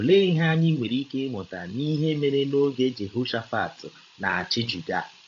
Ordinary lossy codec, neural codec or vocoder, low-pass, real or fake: AAC, 48 kbps; codec, 16 kHz, 16 kbps, FreqCodec, larger model; 7.2 kHz; fake